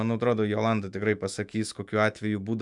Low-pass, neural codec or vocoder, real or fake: 10.8 kHz; autoencoder, 48 kHz, 128 numbers a frame, DAC-VAE, trained on Japanese speech; fake